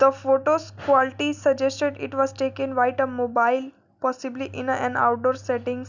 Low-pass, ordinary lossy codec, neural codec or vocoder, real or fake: 7.2 kHz; none; none; real